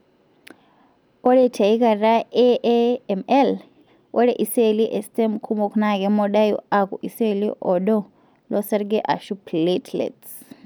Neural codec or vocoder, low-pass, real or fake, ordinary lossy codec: none; none; real; none